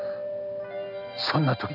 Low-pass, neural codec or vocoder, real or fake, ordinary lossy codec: 5.4 kHz; none; real; none